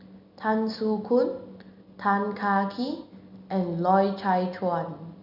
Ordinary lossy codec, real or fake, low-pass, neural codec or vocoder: none; real; 5.4 kHz; none